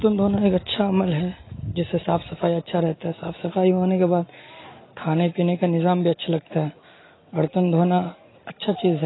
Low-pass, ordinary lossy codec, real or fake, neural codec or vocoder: 7.2 kHz; AAC, 16 kbps; real; none